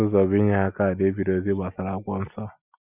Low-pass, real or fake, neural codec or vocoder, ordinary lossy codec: 3.6 kHz; real; none; none